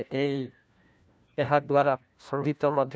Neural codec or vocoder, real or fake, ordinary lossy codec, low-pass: codec, 16 kHz, 1 kbps, FunCodec, trained on LibriTTS, 50 frames a second; fake; none; none